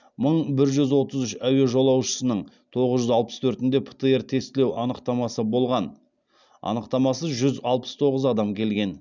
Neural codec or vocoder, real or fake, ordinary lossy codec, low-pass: none; real; none; 7.2 kHz